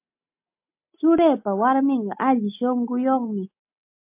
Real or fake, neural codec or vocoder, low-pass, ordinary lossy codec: real; none; 3.6 kHz; MP3, 32 kbps